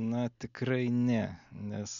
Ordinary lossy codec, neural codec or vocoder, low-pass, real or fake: MP3, 96 kbps; none; 7.2 kHz; real